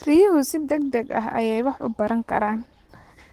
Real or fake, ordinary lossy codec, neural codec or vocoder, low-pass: fake; Opus, 16 kbps; codec, 44.1 kHz, 7.8 kbps, Pupu-Codec; 14.4 kHz